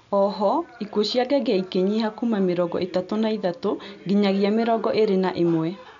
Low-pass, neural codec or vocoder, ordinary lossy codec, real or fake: 7.2 kHz; none; none; real